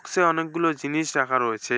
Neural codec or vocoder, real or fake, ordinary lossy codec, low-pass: none; real; none; none